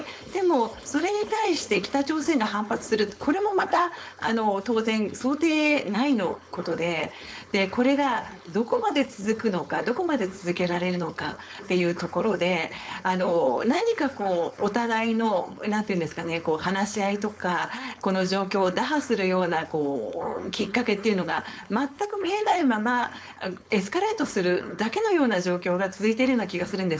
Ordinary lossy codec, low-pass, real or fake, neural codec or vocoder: none; none; fake; codec, 16 kHz, 4.8 kbps, FACodec